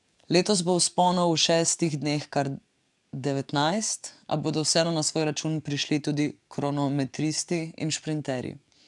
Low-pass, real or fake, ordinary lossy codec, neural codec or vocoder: 10.8 kHz; fake; MP3, 96 kbps; codec, 44.1 kHz, 7.8 kbps, DAC